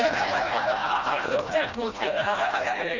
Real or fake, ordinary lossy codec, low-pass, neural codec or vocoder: fake; Opus, 64 kbps; 7.2 kHz; codec, 16 kHz, 1 kbps, FreqCodec, smaller model